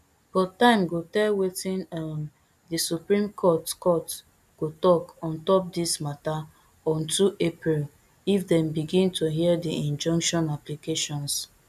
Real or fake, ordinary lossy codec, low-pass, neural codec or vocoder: real; none; 14.4 kHz; none